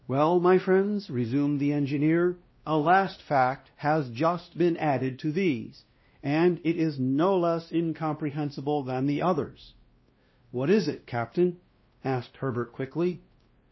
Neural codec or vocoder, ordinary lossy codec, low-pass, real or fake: codec, 16 kHz, 1 kbps, X-Codec, WavLM features, trained on Multilingual LibriSpeech; MP3, 24 kbps; 7.2 kHz; fake